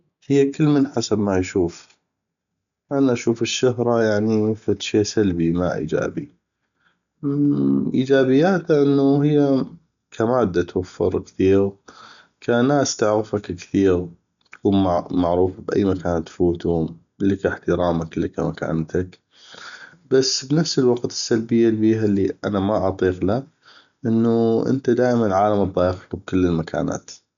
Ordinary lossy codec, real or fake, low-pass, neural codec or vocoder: none; real; 7.2 kHz; none